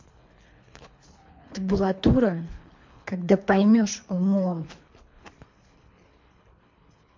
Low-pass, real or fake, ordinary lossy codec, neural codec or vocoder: 7.2 kHz; fake; MP3, 48 kbps; codec, 24 kHz, 3 kbps, HILCodec